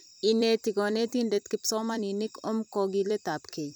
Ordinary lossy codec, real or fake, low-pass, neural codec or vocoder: none; fake; none; vocoder, 44.1 kHz, 128 mel bands every 256 samples, BigVGAN v2